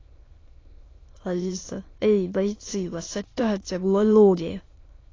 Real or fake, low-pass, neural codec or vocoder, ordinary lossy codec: fake; 7.2 kHz; autoencoder, 22.05 kHz, a latent of 192 numbers a frame, VITS, trained on many speakers; AAC, 32 kbps